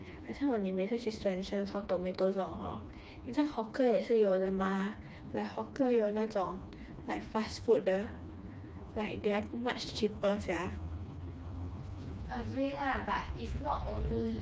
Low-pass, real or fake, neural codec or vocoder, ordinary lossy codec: none; fake; codec, 16 kHz, 2 kbps, FreqCodec, smaller model; none